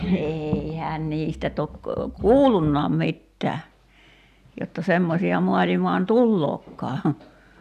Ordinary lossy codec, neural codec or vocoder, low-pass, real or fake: none; none; 14.4 kHz; real